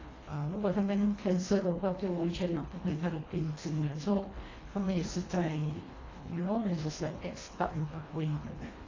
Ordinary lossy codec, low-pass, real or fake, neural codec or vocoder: AAC, 32 kbps; 7.2 kHz; fake; codec, 24 kHz, 1.5 kbps, HILCodec